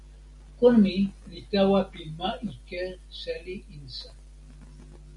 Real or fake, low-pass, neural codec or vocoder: real; 10.8 kHz; none